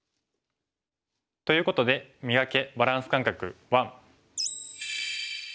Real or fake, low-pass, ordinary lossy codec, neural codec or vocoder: real; none; none; none